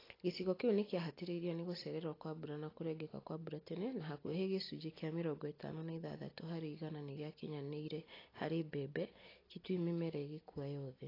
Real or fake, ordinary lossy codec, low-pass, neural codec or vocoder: real; AAC, 24 kbps; 5.4 kHz; none